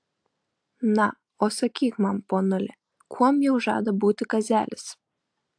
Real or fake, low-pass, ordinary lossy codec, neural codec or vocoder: real; 9.9 kHz; AAC, 64 kbps; none